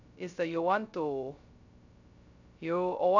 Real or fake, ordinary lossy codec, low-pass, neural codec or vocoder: fake; none; 7.2 kHz; codec, 16 kHz, 0.2 kbps, FocalCodec